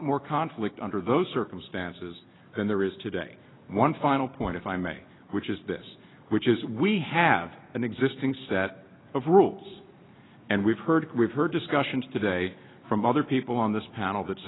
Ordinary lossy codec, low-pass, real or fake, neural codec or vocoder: AAC, 16 kbps; 7.2 kHz; real; none